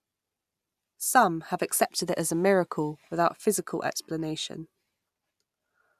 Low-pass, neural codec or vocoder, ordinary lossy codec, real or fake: 14.4 kHz; none; none; real